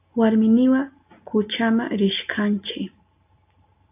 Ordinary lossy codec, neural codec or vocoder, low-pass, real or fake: AAC, 32 kbps; none; 3.6 kHz; real